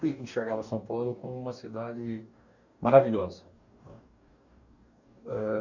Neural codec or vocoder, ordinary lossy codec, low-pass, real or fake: codec, 44.1 kHz, 2.6 kbps, DAC; none; 7.2 kHz; fake